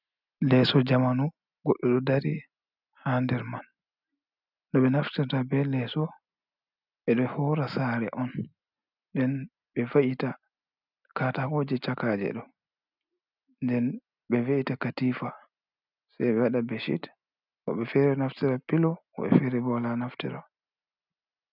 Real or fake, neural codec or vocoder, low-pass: real; none; 5.4 kHz